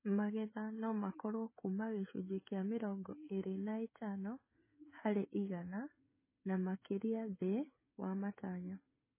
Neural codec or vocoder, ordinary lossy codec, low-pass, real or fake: codec, 16 kHz, 16 kbps, FreqCodec, smaller model; MP3, 16 kbps; 3.6 kHz; fake